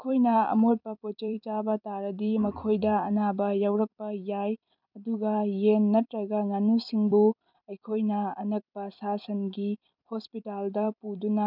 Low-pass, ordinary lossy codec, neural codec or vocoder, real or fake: 5.4 kHz; none; vocoder, 44.1 kHz, 128 mel bands every 256 samples, BigVGAN v2; fake